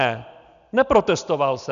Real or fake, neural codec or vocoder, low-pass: real; none; 7.2 kHz